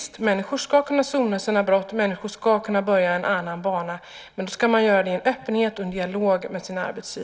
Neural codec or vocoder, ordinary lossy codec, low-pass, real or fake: none; none; none; real